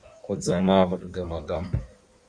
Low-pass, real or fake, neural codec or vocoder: 9.9 kHz; fake; codec, 16 kHz in and 24 kHz out, 1.1 kbps, FireRedTTS-2 codec